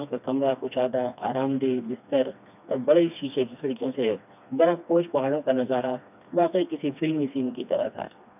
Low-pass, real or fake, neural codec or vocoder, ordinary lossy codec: 3.6 kHz; fake; codec, 16 kHz, 2 kbps, FreqCodec, smaller model; none